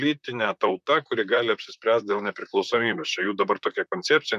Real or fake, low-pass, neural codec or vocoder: fake; 14.4 kHz; vocoder, 44.1 kHz, 128 mel bands, Pupu-Vocoder